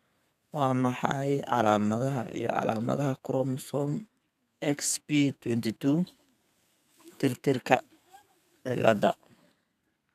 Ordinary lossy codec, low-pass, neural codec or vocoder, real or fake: none; 14.4 kHz; codec, 32 kHz, 1.9 kbps, SNAC; fake